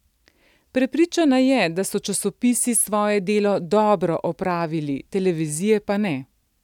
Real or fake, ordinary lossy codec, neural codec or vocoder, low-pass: real; none; none; 19.8 kHz